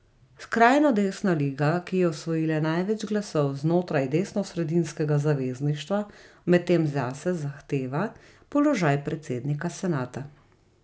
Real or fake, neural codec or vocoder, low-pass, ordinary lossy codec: real; none; none; none